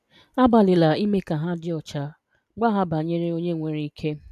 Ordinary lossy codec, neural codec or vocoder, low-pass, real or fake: none; none; 14.4 kHz; real